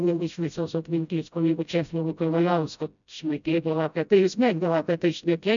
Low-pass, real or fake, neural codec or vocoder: 7.2 kHz; fake; codec, 16 kHz, 0.5 kbps, FreqCodec, smaller model